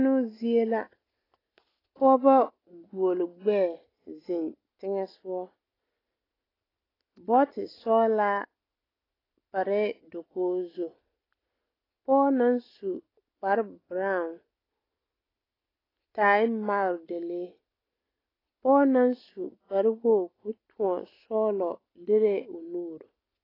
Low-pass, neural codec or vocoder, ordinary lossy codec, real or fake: 5.4 kHz; none; AAC, 24 kbps; real